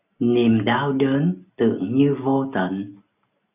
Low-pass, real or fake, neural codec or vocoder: 3.6 kHz; real; none